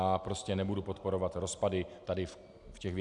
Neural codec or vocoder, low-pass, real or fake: none; 10.8 kHz; real